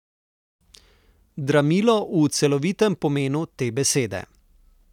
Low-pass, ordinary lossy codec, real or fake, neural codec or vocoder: 19.8 kHz; none; real; none